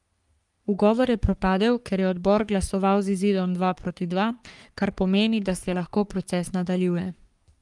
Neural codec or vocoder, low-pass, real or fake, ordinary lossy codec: codec, 44.1 kHz, 3.4 kbps, Pupu-Codec; 10.8 kHz; fake; Opus, 32 kbps